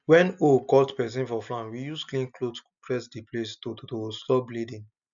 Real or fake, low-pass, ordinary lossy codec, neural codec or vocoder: real; 7.2 kHz; none; none